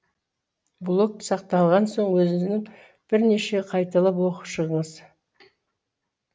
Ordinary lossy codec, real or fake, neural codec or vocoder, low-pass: none; real; none; none